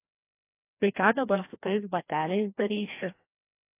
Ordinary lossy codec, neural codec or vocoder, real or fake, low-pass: AAC, 24 kbps; codec, 16 kHz, 1 kbps, FreqCodec, larger model; fake; 3.6 kHz